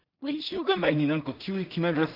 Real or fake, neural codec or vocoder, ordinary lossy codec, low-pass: fake; codec, 16 kHz in and 24 kHz out, 0.4 kbps, LongCat-Audio-Codec, two codebook decoder; none; 5.4 kHz